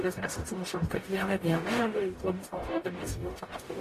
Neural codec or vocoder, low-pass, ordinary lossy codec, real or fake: codec, 44.1 kHz, 0.9 kbps, DAC; 14.4 kHz; AAC, 48 kbps; fake